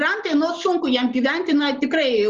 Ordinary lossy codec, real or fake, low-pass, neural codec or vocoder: Opus, 24 kbps; real; 7.2 kHz; none